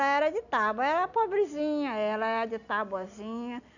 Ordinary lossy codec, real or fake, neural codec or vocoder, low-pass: none; real; none; 7.2 kHz